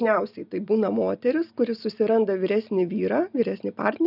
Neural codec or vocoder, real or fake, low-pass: none; real; 5.4 kHz